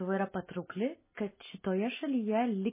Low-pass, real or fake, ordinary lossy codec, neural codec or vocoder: 3.6 kHz; real; MP3, 16 kbps; none